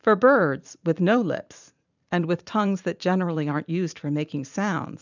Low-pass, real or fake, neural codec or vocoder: 7.2 kHz; fake; vocoder, 44.1 kHz, 128 mel bands every 512 samples, BigVGAN v2